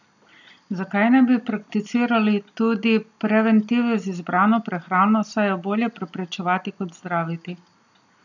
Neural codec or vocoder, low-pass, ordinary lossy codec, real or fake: none; 7.2 kHz; none; real